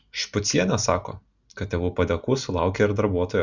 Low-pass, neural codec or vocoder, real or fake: 7.2 kHz; none; real